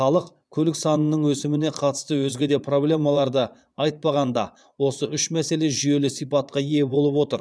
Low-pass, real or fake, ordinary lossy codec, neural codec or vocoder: none; fake; none; vocoder, 22.05 kHz, 80 mel bands, Vocos